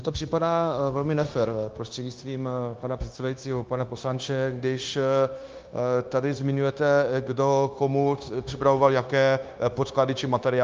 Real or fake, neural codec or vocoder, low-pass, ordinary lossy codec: fake; codec, 16 kHz, 0.9 kbps, LongCat-Audio-Codec; 7.2 kHz; Opus, 24 kbps